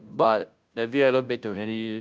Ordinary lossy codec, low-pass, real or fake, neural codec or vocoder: none; none; fake; codec, 16 kHz, 0.5 kbps, FunCodec, trained on Chinese and English, 25 frames a second